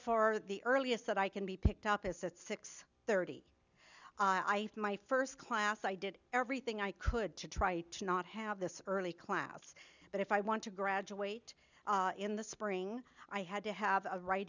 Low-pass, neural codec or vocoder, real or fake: 7.2 kHz; none; real